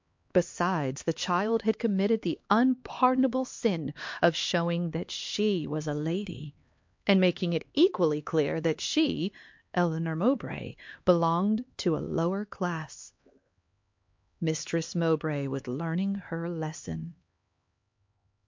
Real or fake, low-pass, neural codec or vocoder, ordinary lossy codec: fake; 7.2 kHz; codec, 16 kHz, 2 kbps, X-Codec, HuBERT features, trained on LibriSpeech; MP3, 48 kbps